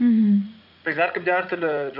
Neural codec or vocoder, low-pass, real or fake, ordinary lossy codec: vocoder, 44.1 kHz, 80 mel bands, Vocos; 5.4 kHz; fake; none